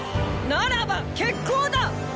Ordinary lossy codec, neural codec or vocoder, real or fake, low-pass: none; none; real; none